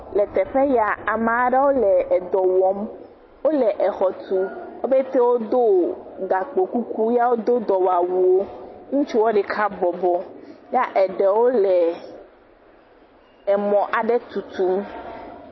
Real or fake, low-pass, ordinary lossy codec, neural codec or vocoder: real; 7.2 kHz; MP3, 24 kbps; none